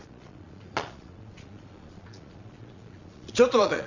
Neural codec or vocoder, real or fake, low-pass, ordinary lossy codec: vocoder, 22.05 kHz, 80 mel bands, Vocos; fake; 7.2 kHz; none